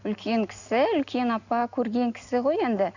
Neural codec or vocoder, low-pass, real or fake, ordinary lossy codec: none; 7.2 kHz; real; none